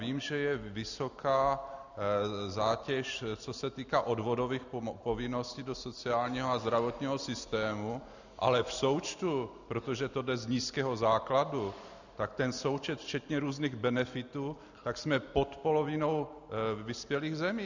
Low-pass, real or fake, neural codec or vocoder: 7.2 kHz; real; none